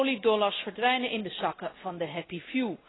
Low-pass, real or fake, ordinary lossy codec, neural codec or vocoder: 7.2 kHz; real; AAC, 16 kbps; none